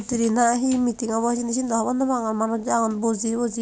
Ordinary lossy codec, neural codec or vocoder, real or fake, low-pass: none; none; real; none